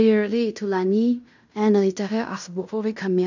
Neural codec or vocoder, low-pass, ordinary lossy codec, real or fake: codec, 16 kHz in and 24 kHz out, 0.9 kbps, LongCat-Audio-Codec, fine tuned four codebook decoder; 7.2 kHz; none; fake